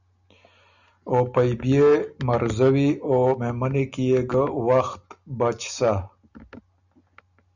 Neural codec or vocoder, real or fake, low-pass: none; real; 7.2 kHz